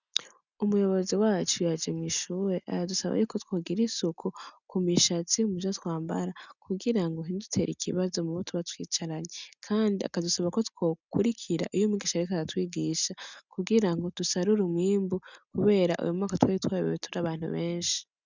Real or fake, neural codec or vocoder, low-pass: real; none; 7.2 kHz